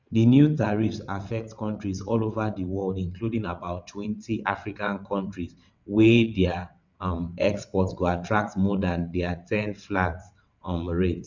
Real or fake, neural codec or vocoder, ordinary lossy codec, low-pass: fake; vocoder, 22.05 kHz, 80 mel bands, WaveNeXt; none; 7.2 kHz